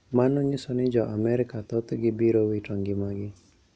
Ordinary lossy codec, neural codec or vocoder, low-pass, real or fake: none; none; none; real